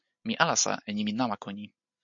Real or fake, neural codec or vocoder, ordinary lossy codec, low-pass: real; none; MP3, 48 kbps; 7.2 kHz